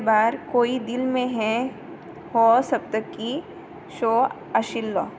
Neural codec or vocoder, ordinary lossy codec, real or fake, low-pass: none; none; real; none